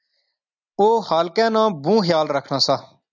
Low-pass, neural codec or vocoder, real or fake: 7.2 kHz; none; real